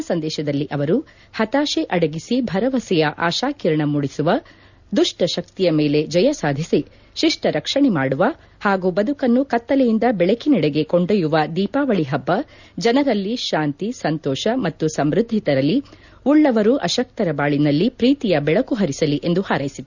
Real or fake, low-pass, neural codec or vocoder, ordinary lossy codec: real; 7.2 kHz; none; none